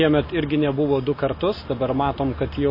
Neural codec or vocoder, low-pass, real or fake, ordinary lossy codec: none; 5.4 kHz; real; MP3, 24 kbps